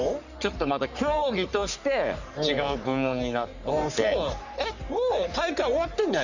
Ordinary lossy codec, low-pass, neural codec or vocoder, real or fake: none; 7.2 kHz; codec, 44.1 kHz, 3.4 kbps, Pupu-Codec; fake